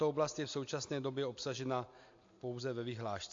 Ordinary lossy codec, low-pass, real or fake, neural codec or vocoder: AAC, 48 kbps; 7.2 kHz; real; none